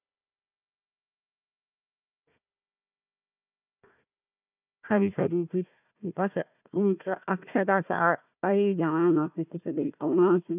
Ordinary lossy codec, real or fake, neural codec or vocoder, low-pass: none; fake; codec, 16 kHz, 1 kbps, FunCodec, trained on Chinese and English, 50 frames a second; 3.6 kHz